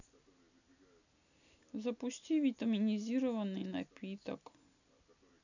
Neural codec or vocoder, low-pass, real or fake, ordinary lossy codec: none; 7.2 kHz; real; none